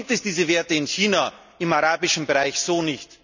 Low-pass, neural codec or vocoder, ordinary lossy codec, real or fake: 7.2 kHz; none; none; real